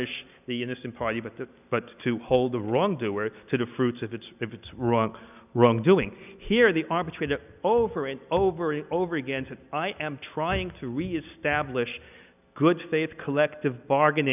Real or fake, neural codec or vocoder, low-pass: real; none; 3.6 kHz